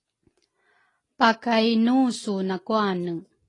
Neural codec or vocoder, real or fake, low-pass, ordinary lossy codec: none; real; 10.8 kHz; AAC, 32 kbps